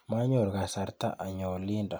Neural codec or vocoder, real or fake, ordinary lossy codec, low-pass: none; real; none; none